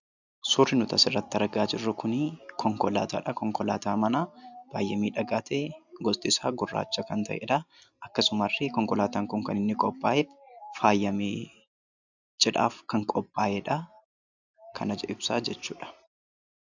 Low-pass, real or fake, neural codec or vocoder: 7.2 kHz; real; none